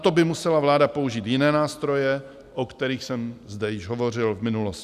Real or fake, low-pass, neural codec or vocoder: real; 14.4 kHz; none